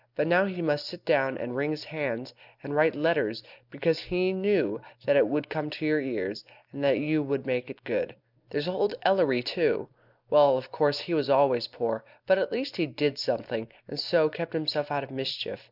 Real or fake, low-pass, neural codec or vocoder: real; 5.4 kHz; none